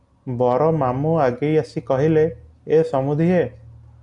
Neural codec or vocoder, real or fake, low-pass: none; real; 10.8 kHz